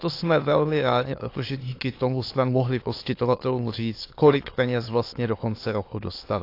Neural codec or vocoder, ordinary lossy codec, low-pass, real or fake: autoencoder, 22.05 kHz, a latent of 192 numbers a frame, VITS, trained on many speakers; AAC, 32 kbps; 5.4 kHz; fake